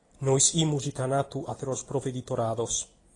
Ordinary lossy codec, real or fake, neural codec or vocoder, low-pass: AAC, 32 kbps; real; none; 10.8 kHz